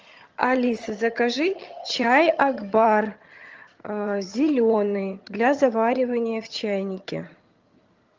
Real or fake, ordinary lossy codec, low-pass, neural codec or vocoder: fake; Opus, 24 kbps; 7.2 kHz; vocoder, 22.05 kHz, 80 mel bands, HiFi-GAN